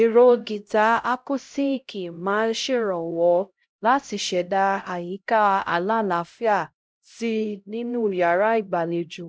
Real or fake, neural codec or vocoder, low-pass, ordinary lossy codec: fake; codec, 16 kHz, 0.5 kbps, X-Codec, HuBERT features, trained on LibriSpeech; none; none